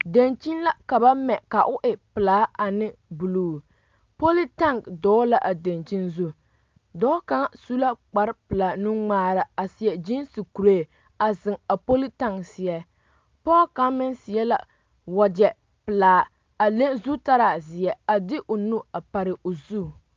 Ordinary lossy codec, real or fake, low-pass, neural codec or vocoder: Opus, 24 kbps; real; 7.2 kHz; none